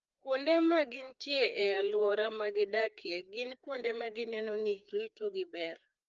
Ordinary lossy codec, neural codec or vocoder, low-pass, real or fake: Opus, 24 kbps; codec, 16 kHz, 2 kbps, FreqCodec, larger model; 7.2 kHz; fake